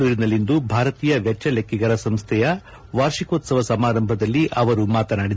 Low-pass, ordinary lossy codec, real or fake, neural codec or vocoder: none; none; real; none